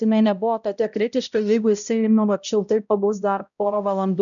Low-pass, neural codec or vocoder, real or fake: 7.2 kHz; codec, 16 kHz, 0.5 kbps, X-Codec, HuBERT features, trained on balanced general audio; fake